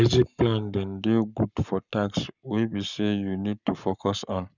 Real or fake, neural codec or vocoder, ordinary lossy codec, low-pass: fake; codec, 44.1 kHz, 7.8 kbps, Pupu-Codec; none; 7.2 kHz